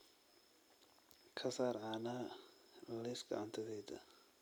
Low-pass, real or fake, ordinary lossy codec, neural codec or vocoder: none; real; none; none